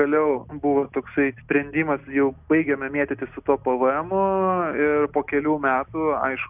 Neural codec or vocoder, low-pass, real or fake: none; 3.6 kHz; real